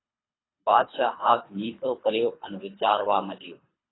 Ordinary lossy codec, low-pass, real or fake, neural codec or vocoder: AAC, 16 kbps; 7.2 kHz; fake; codec, 24 kHz, 3 kbps, HILCodec